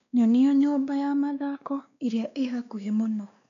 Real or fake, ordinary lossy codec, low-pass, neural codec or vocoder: fake; none; 7.2 kHz; codec, 16 kHz, 2 kbps, X-Codec, WavLM features, trained on Multilingual LibriSpeech